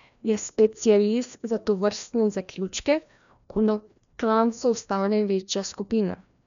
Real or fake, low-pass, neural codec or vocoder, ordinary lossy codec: fake; 7.2 kHz; codec, 16 kHz, 1 kbps, FreqCodec, larger model; none